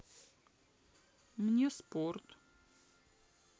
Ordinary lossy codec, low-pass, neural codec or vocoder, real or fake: none; none; none; real